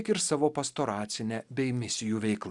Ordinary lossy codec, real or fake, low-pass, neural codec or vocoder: Opus, 64 kbps; real; 10.8 kHz; none